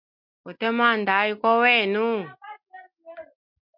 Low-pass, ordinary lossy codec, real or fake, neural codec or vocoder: 5.4 kHz; MP3, 48 kbps; real; none